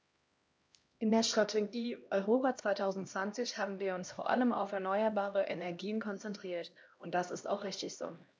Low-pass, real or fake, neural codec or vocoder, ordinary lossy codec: none; fake; codec, 16 kHz, 1 kbps, X-Codec, HuBERT features, trained on LibriSpeech; none